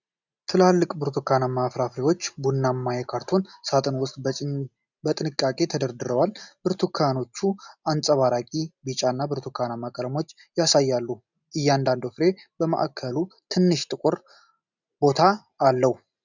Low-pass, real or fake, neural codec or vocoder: 7.2 kHz; real; none